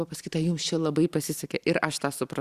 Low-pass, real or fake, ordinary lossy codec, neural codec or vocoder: 14.4 kHz; real; Opus, 64 kbps; none